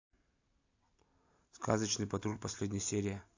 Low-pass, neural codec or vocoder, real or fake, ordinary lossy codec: 7.2 kHz; none; real; AAC, 48 kbps